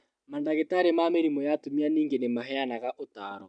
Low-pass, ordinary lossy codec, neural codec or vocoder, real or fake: 9.9 kHz; none; none; real